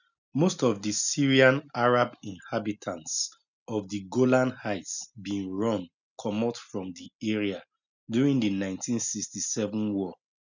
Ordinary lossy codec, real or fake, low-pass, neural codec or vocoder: none; real; 7.2 kHz; none